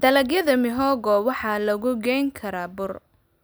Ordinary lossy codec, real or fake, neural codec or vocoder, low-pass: none; real; none; none